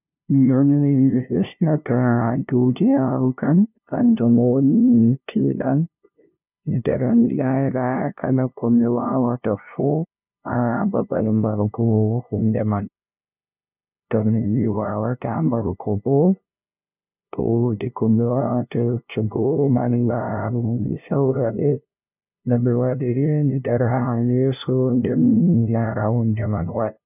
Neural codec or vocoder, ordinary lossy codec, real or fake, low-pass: codec, 16 kHz, 0.5 kbps, FunCodec, trained on LibriTTS, 25 frames a second; none; fake; 3.6 kHz